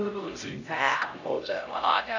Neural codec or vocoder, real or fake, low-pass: codec, 16 kHz, 0.5 kbps, X-Codec, HuBERT features, trained on LibriSpeech; fake; 7.2 kHz